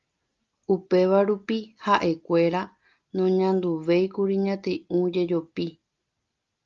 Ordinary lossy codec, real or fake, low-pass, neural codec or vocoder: Opus, 32 kbps; real; 7.2 kHz; none